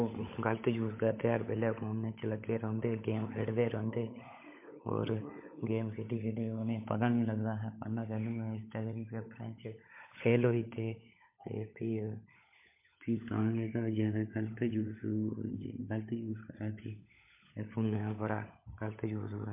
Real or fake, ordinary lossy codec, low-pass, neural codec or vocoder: fake; MP3, 24 kbps; 3.6 kHz; codec, 16 kHz, 16 kbps, FunCodec, trained on LibriTTS, 50 frames a second